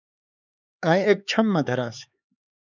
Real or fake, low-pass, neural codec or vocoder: fake; 7.2 kHz; codec, 16 kHz, 4 kbps, X-Codec, HuBERT features, trained on LibriSpeech